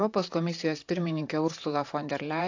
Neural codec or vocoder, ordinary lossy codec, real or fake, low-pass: vocoder, 44.1 kHz, 128 mel bands, Pupu-Vocoder; AAC, 48 kbps; fake; 7.2 kHz